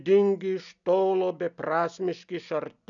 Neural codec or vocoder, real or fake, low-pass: none; real; 7.2 kHz